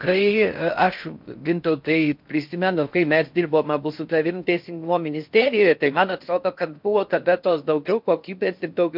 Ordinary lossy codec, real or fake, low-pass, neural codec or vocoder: MP3, 48 kbps; fake; 5.4 kHz; codec, 16 kHz in and 24 kHz out, 0.6 kbps, FocalCodec, streaming, 4096 codes